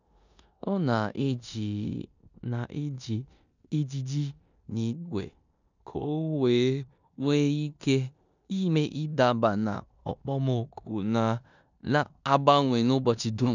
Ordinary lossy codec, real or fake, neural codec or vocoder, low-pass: none; fake; codec, 16 kHz in and 24 kHz out, 0.9 kbps, LongCat-Audio-Codec, four codebook decoder; 7.2 kHz